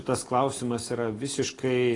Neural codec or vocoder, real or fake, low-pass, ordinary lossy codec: none; real; 10.8 kHz; AAC, 32 kbps